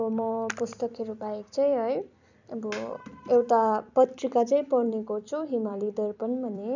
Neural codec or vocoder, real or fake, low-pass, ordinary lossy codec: vocoder, 44.1 kHz, 128 mel bands every 256 samples, BigVGAN v2; fake; 7.2 kHz; none